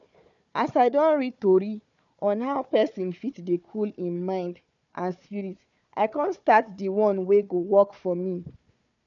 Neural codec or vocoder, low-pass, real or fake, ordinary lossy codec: codec, 16 kHz, 4 kbps, FunCodec, trained on Chinese and English, 50 frames a second; 7.2 kHz; fake; MP3, 96 kbps